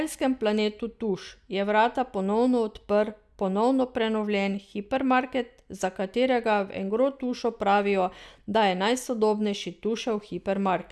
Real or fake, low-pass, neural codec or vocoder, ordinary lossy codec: real; none; none; none